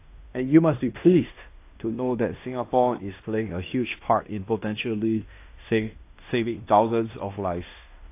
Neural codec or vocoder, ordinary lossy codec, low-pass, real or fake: codec, 16 kHz in and 24 kHz out, 0.9 kbps, LongCat-Audio-Codec, fine tuned four codebook decoder; AAC, 24 kbps; 3.6 kHz; fake